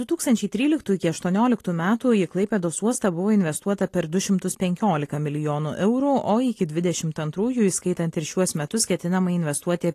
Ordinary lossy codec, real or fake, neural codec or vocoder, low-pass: AAC, 48 kbps; real; none; 14.4 kHz